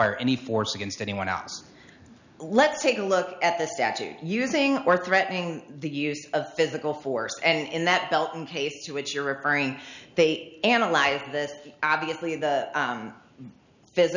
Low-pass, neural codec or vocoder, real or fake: 7.2 kHz; none; real